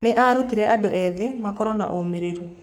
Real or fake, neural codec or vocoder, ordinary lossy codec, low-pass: fake; codec, 44.1 kHz, 3.4 kbps, Pupu-Codec; none; none